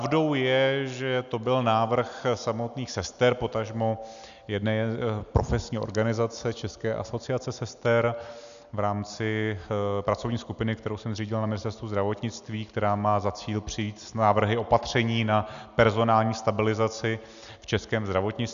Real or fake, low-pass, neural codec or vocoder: real; 7.2 kHz; none